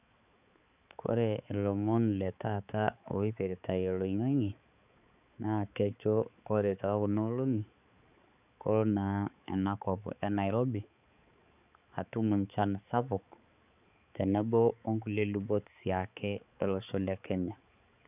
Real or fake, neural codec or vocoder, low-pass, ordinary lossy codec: fake; codec, 16 kHz, 4 kbps, X-Codec, HuBERT features, trained on balanced general audio; 3.6 kHz; none